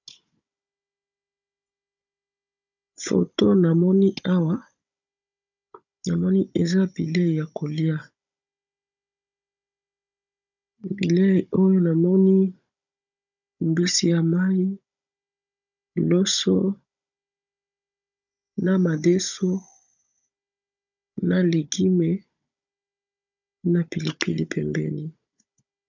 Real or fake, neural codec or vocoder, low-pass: fake; codec, 16 kHz, 16 kbps, FunCodec, trained on Chinese and English, 50 frames a second; 7.2 kHz